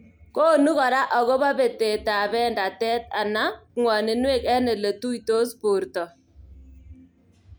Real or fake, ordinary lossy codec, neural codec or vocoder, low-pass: real; none; none; none